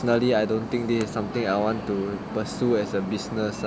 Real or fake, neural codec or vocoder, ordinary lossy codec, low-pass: real; none; none; none